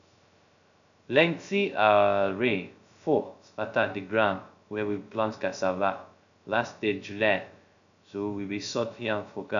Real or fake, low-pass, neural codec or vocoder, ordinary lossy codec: fake; 7.2 kHz; codec, 16 kHz, 0.2 kbps, FocalCodec; none